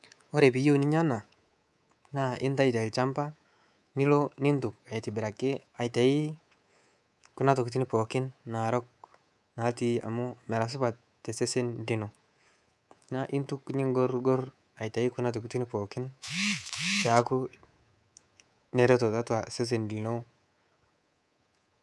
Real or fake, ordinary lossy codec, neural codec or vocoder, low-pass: fake; none; codec, 24 kHz, 3.1 kbps, DualCodec; none